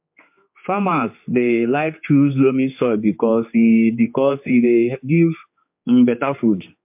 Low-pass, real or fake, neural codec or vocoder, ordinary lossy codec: 3.6 kHz; fake; codec, 16 kHz, 4 kbps, X-Codec, HuBERT features, trained on general audio; MP3, 32 kbps